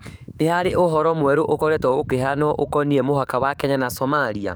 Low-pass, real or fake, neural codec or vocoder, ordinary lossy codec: none; fake; codec, 44.1 kHz, 7.8 kbps, DAC; none